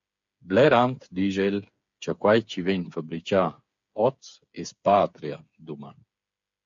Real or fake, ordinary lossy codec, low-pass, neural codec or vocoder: fake; MP3, 48 kbps; 7.2 kHz; codec, 16 kHz, 8 kbps, FreqCodec, smaller model